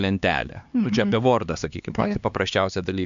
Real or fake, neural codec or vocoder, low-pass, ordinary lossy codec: fake; codec, 16 kHz, 2 kbps, X-Codec, HuBERT features, trained on LibriSpeech; 7.2 kHz; MP3, 64 kbps